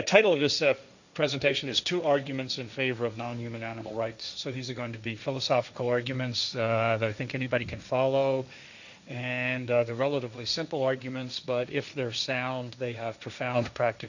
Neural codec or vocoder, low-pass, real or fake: codec, 16 kHz, 1.1 kbps, Voila-Tokenizer; 7.2 kHz; fake